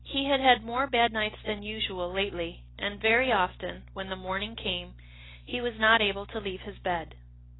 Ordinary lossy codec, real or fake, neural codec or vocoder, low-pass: AAC, 16 kbps; real; none; 7.2 kHz